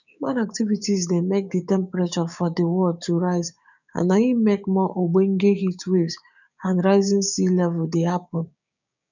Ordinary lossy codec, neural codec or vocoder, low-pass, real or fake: none; codec, 16 kHz, 6 kbps, DAC; 7.2 kHz; fake